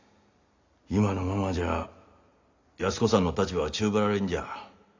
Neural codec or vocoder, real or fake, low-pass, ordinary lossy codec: none; real; 7.2 kHz; MP3, 64 kbps